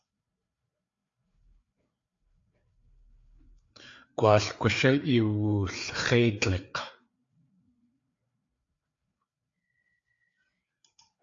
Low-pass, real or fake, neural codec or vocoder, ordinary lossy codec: 7.2 kHz; fake; codec, 16 kHz, 4 kbps, FreqCodec, larger model; AAC, 48 kbps